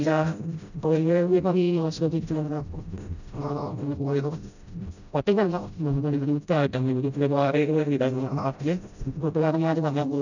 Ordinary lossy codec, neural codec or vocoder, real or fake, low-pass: none; codec, 16 kHz, 0.5 kbps, FreqCodec, smaller model; fake; 7.2 kHz